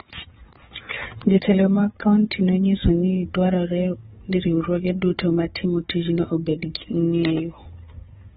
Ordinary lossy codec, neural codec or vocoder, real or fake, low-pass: AAC, 16 kbps; codec, 16 kHz, 4 kbps, FunCodec, trained on Chinese and English, 50 frames a second; fake; 7.2 kHz